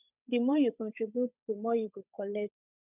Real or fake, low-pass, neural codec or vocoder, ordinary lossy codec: fake; 3.6 kHz; codec, 16 kHz, 8 kbps, FunCodec, trained on Chinese and English, 25 frames a second; none